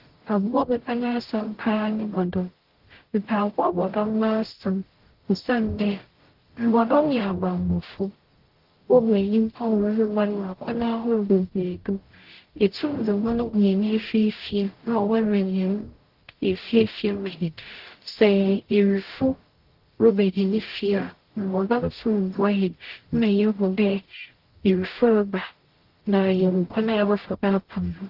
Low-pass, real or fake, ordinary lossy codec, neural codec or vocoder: 5.4 kHz; fake; Opus, 16 kbps; codec, 44.1 kHz, 0.9 kbps, DAC